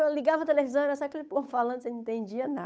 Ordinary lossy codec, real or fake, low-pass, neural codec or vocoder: none; fake; none; codec, 16 kHz, 8 kbps, FunCodec, trained on Chinese and English, 25 frames a second